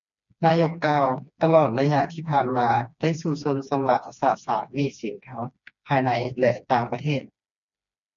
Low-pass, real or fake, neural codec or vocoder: 7.2 kHz; fake; codec, 16 kHz, 2 kbps, FreqCodec, smaller model